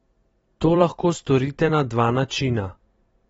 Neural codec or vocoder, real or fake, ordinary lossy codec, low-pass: vocoder, 44.1 kHz, 128 mel bands every 256 samples, BigVGAN v2; fake; AAC, 24 kbps; 19.8 kHz